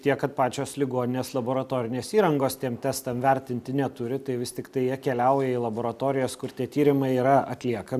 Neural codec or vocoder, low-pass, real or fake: none; 14.4 kHz; real